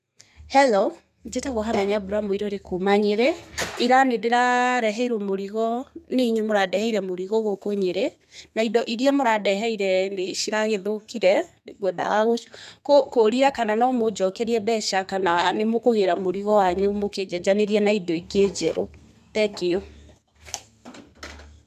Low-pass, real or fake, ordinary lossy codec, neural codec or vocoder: 14.4 kHz; fake; none; codec, 32 kHz, 1.9 kbps, SNAC